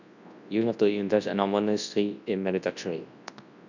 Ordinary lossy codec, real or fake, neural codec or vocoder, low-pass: none; fake; codec, 24 kHz, 0.9 kbps, WavTokenizer, large speech release; 7.2 kHz